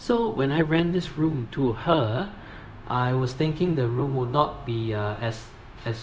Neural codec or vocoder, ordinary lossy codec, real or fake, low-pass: codec, 16 kHz, 0.4 kbps, LongCat-Audio-Codec; none; fake; none